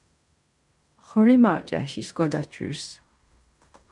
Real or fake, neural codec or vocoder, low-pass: fake; codec, 16 kHz in and 24 kHz out, 0.9 kbps, LongCat-Audio-Codec, fine tuned four codebook decoder; 10.8 kHz